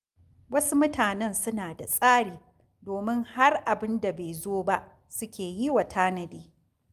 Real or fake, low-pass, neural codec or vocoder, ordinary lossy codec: real; 14.4 kHz; none; Opus, 32 kbps